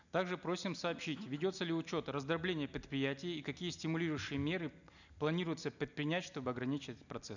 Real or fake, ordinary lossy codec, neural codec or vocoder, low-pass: real; none; none; 7.2 kHz